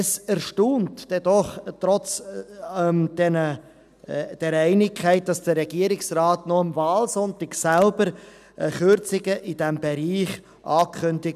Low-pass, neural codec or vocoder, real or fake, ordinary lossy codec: 14.4 kHz; none; real; none